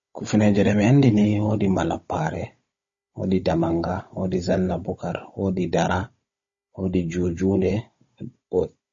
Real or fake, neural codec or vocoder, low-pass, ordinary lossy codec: fake; codec, 16 kHz, 16 kbps, FunCodec, trained on Chinese and English, 50 frames a second; 7.2 kHz; MP3, 32 kbps